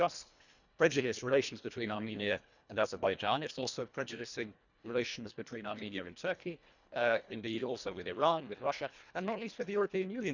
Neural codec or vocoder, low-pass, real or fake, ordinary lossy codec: codec, 24 kHz, 1.5 kbps, HILCodec; 7.2 kHz; fake; none